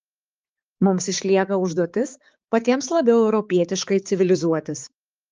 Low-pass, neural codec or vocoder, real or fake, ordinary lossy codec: 7.2 kHz; codec, 16 kHz, 4 kbps, X-Codec, HuBERT features, trained on balanced general audio; fake; Opus, 24 kbps